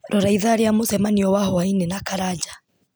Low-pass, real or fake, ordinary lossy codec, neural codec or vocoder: none; real; none; none